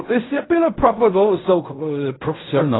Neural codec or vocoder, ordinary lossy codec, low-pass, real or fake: codec, 16 kHz in and 24 kHz out, 0.4 kbps, LongCat-Audio-Codec, fine tuned four codebook decoder; AAC, 16 kbps; 7.2 kHz; fake